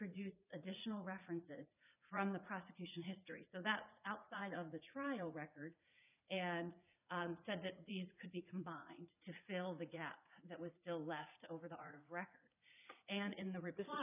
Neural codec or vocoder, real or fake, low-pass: vocoder, 44.1 kHz, 80 mel bands, Vocos; fake; 3.6 kHz